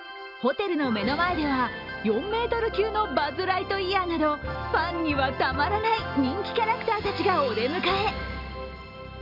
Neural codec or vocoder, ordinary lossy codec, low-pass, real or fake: none; none; 5.4 kHz; real